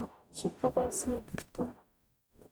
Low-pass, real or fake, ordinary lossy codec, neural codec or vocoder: none; fake; none; codec, 44.1 kHz, 0.9 kbps, DAC